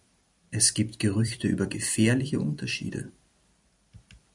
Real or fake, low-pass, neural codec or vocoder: fake; 10.8 kHz; vocoder, 44.1 kHz, 128 mel bands every 256 samples, BigVGAN v2